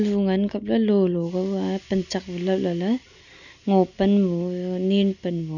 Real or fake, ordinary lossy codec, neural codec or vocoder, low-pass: real; none; none; 7.2 kHz